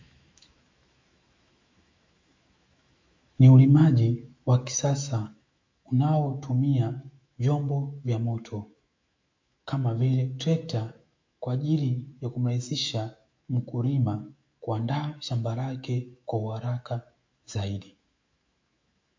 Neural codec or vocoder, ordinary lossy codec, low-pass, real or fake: autoencoder, 48 kHz, 128 numbers a frame, DAC-VAE, trained on Japanese speech; MP3, 48 kbps; 7.2 kHz; fake